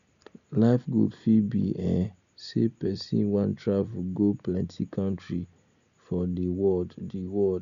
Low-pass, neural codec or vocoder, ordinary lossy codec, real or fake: 7.2 kHz; none; none; real